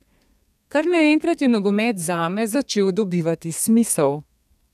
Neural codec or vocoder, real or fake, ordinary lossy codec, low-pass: codec, 32 kHz, 1.9 kbps, SNAC; fake; none; 14.4 kHz